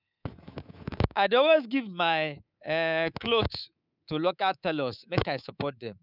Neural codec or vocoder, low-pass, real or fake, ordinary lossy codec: codec, 24 kHz, 3.1 kbps, DualCodec; 5.4 kHz; fake; none